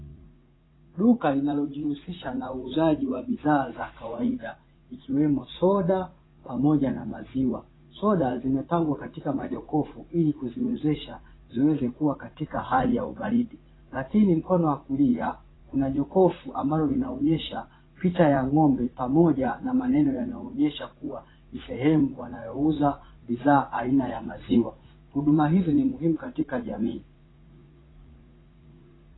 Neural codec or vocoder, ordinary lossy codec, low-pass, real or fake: vocoder, 44.1 kHz, 80 mel bands, Vocos; AAC, 16 kbps; 7.2 kHz; fake